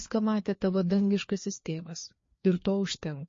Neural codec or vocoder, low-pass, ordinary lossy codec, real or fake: codec, 16 kHz, 2 kbps, FreqCodec, larger model; 7.2 kHz; MP3, 32 kbps; fake